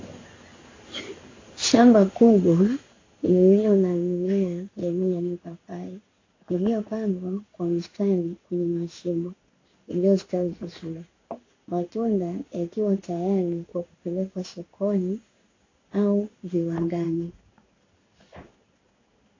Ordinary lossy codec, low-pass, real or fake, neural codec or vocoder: AAC, 32 kbps; 7.2 kHz; fake; codec, 16 kHz in and 24 kHz out, 1 kbps, XY-Tokenizer